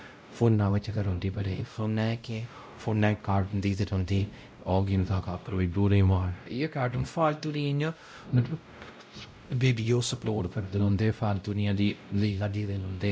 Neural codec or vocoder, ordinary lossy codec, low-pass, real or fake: codec, 16 kHz, 0.5 kbps, X-Codec, WavLM features, trained on Multilingual LibriSpeech; none; none; fake